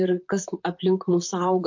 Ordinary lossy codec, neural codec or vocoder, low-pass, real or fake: MP3, 48 kbps; vocoder, 44.1 kHz, 128 mel bands, Pupu-Vocoder; 7.2 kHz; fake